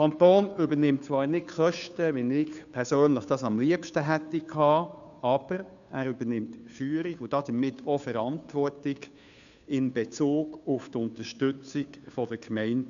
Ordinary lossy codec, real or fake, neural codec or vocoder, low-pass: MP3, 96 kbps; fake; codec, 16 kHz, 2 kbps, FunCodec, trained on Chinese and English, 25 frames a second; 7.2 kHz